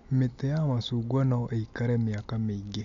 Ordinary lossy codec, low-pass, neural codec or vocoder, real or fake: MP3, 64 kbps; 7.2 kHz; none; real